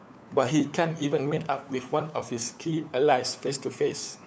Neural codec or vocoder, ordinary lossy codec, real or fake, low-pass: codec, 16 kHz, 4 kbps, FunCodec, trained on LibriTTS, 50 frames a second; none; fake; none